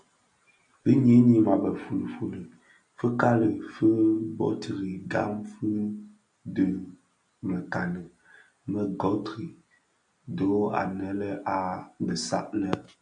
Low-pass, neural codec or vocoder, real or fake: 9.9 kHz; none; real